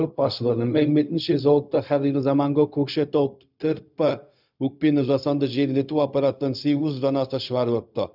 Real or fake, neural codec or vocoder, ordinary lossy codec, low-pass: fake; codec, 16 kHz, 0.4 kbps, LongCat-Audio-Codec; none; 5.4 kHz